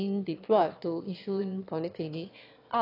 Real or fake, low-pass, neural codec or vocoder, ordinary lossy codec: fake; 5.4 kHz; autoencoder, 22.05 kHz, a latent of 192 numbers a frame, VITS, trained on one speaker; AAC, 32 kbps